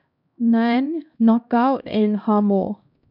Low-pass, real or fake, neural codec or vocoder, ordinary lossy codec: 5.4 kHz; fake; codec, 16 kHz, 1 kbps, X-Codec, HuBERT features, trained on LibriSpeech; none